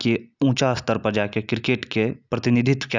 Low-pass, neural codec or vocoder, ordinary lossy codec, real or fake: 7.2 kHz; none; none; real